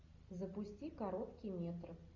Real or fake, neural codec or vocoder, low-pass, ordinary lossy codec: real; none; 7.2 kHz; MP3, 64 kbps